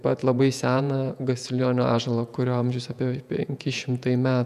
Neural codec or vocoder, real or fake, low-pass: vocoder, 48 kHz, 128 mel bands, Vocos; fake; 14.4 kHz